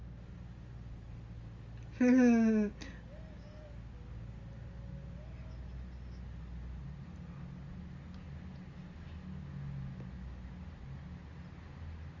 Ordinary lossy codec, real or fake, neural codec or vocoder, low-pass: Opus, 32 kbps; real; none; 7.2 kHz